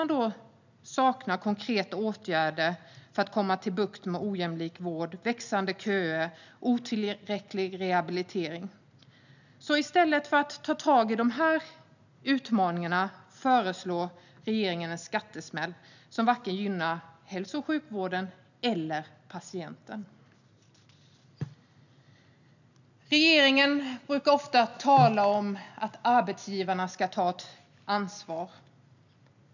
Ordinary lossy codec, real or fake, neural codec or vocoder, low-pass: none; real; none; 7.2 kHz